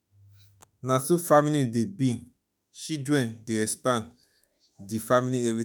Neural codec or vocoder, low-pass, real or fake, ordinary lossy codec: autoencoder, 48 kHz, 32 numbers a frame, DAC-VAE, trained on Japanese speech; none; fake; none